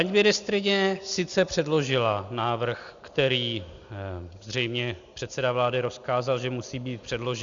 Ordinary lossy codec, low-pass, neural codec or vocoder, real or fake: Opus, 64 kbps; 7.2 kHz; none; real